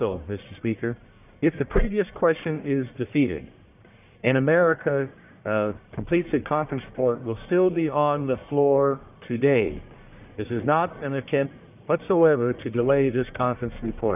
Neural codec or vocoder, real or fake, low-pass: codec, 44.1 kHz, 1.7 kbps, Pupu-Codec; fake; 3.6 kHz